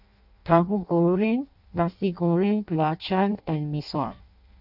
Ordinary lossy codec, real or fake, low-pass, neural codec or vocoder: none; fake; 5.4 kHz; codec, 16 kHz in and 24 kHz out, 0.6 kbps, FireRedTTS-2 codec